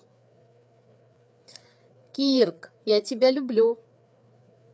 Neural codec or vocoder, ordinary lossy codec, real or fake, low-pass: codec, 16 kHz, 4 kbps, FreqCodec, larger model; none; fake; none